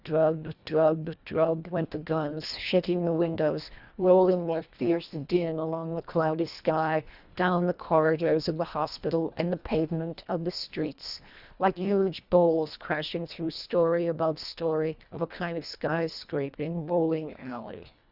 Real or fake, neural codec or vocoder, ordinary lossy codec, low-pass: fake; codec, 24 kHz, 1.5 kbps, HILCodec; Opus, 64 kbps; 5.4 kHz